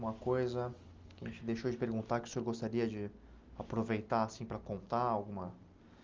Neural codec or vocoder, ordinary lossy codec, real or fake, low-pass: none; Opus, 32 kbps; real; 7.2 kHz